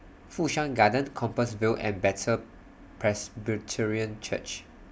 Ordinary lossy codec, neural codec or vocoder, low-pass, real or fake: none; none; none; real